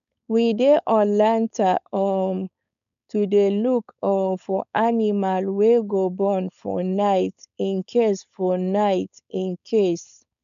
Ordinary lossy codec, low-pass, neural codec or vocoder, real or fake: none; 7.2 kHz; codec, 16 kHz, 4.8 kbps, FACodec; fake